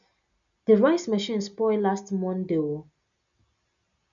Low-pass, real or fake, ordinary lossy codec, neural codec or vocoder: 7.2 kHz; real; none; none